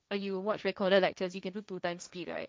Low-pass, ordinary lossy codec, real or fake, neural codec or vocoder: none; none; fake; codec, 16 kHz, 1.1 kbps, Voila-Tokenizer